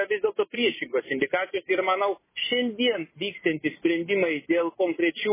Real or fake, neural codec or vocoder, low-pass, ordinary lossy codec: real; none; 3.6 kHz; MP3, 16 kbps